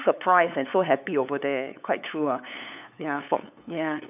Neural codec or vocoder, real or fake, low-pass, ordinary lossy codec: codec, 16 kHz, 8 kbps, FunCodec, trained on LibriTTS, 25 frames a second; fake; 3.6 kHz; none